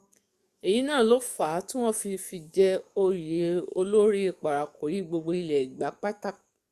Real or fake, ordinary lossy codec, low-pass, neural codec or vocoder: fake; Opus, 64 kbps; 14.4 kHz; codec, 44.1 kHz, 7.8 kbps, DAC